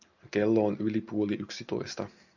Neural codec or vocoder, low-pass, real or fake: none; 7.2 kHz; real